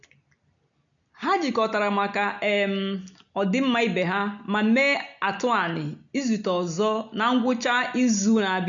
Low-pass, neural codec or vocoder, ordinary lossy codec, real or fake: 7.2 kHz; none; none; real